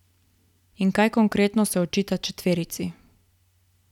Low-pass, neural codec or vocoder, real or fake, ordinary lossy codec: 19.8 kHz; none; real; none